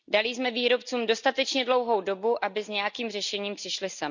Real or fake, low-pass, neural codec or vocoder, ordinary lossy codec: real; 7.2 kHz; none; none